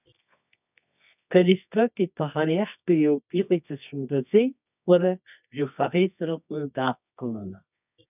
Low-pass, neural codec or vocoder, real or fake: 3.6 kHz; codec, 24 kHz, 0.9 kbps, WavTokenizer, medium music audio release; fake